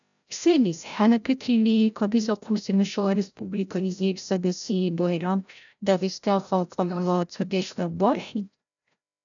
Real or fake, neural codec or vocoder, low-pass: fake; codec, 16 kHz, 0.5 kbps, FreqCodec, larger model; 7.2 kHz